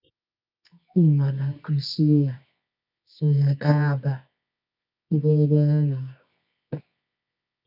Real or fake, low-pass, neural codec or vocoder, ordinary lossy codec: fake; 5.4 kHz; codec, 24 kHz, 0.9 kbps, WavTokenizer, medium music audio release; none